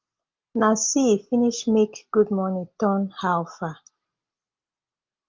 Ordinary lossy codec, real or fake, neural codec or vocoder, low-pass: Opus, 32 kbps; real; none; 7.2 kHz